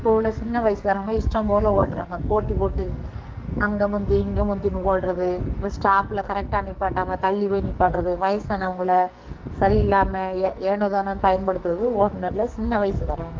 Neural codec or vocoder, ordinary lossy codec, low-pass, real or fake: codec, 44.1 kHz, 2.6 kbps, SNAC; Opus, 32 kbps; 7.2 kHz; fake